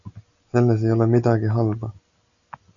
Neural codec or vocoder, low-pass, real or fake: none; 7.2 kHz; real